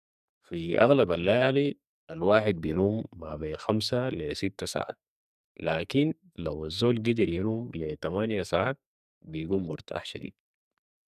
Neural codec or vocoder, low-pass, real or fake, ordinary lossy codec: codec, 44.1 kHz, 2.6 kbps, SNAC; 14.4 kHz; fake; none